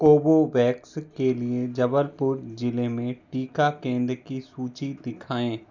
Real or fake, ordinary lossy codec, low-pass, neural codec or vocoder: real; none; 7.2 kHz; none